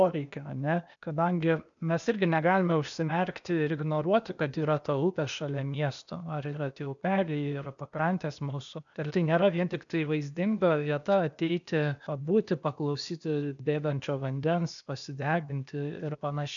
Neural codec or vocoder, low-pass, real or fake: codec, 16 kHz, 0.8 kbps, ZipCodec; 7.2 kHz; fake